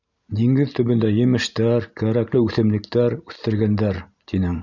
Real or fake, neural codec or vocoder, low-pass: real; none; 7.2 kHz